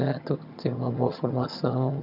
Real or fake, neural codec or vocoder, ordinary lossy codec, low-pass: fake; vocoder, 22.05 kHz, 80 mel bands, HiFi-GAN; none; 5.4 kHz